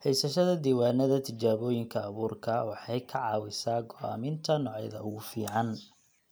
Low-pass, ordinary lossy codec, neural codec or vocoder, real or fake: none; none; none; real